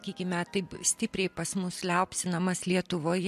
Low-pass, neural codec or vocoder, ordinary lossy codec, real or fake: 14.4 kHz; none; MP3, 64 kbps; real